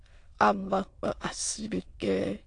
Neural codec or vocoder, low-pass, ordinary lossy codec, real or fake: autoencoder, 22.05 kHz, a latent of 192 numbers a frame, VITS, trained on many speakers; 9.9 kHz; AAC, 48 kbps; fake